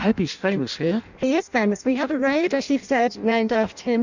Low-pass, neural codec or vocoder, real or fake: 7.2 kHz; codec, 16 kHz in and 24 kHz out, 0.6 kbps, FireRedTTS-2 codec; fake